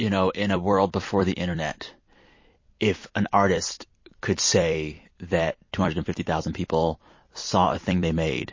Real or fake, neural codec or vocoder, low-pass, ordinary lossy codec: fake; vocoder, 44.1 kHz, 128 mel bands every 256 samples, BigVGAN v2; 7.2 kHz; MP3, 32 kbps